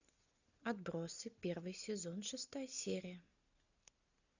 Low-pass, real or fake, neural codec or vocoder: 7.2 kHz; real; none